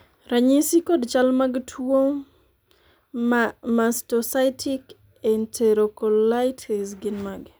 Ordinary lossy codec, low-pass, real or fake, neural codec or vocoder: none; none; real; none